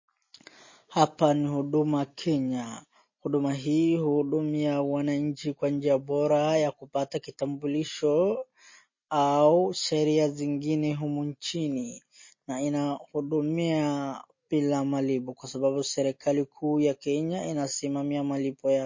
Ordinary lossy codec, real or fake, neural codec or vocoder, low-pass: MP3, 32 kbps; real; none; 7.2 kHz